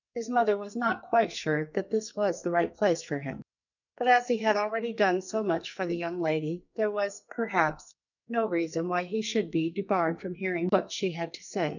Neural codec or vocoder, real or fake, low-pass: codec, 44.1 kHz, 2.6 kbps, SNAC; fake; 7.2 kHz